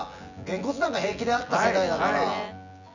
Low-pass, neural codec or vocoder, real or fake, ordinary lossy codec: 7.2 kHz; vocoder, 24 kHz, 100 mel bands, Vocos; fake; none